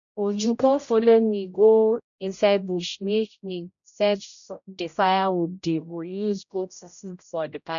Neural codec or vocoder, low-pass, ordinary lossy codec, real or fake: codec, 16 kHz, 0.5 kbps, X-Codec, HuBERT features, trained on general audio; 7.2 kHz; none; fake